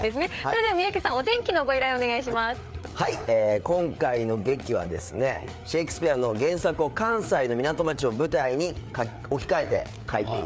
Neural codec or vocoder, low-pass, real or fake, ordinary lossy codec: codec, 16 kHz, 4 kbps, FreqCodec, larger model; none; fake; none